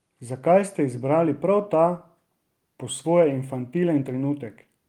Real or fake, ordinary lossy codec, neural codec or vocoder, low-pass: real; Opus, 24 kbps; none; 19.8 kHz